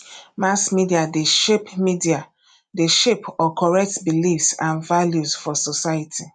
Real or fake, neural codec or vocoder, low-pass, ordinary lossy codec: real; none; 9.9 kHz; none